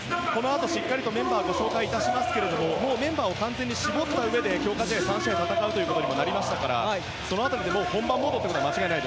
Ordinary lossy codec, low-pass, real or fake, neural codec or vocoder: none; none; real; none